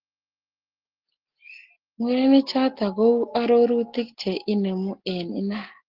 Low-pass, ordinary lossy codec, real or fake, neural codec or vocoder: 5.4 kHz; Opus, 16 kbps; real; none